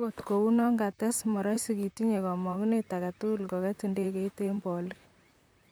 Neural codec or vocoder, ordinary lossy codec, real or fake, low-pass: vocoder, 44.1 kHz, 128 mel bands, Pupu-Vocoder; none; fake; none